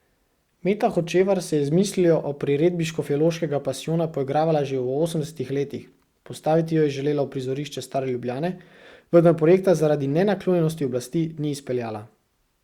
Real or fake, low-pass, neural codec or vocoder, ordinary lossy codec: real; 19.8 kHz; none; Opus, 64 kbps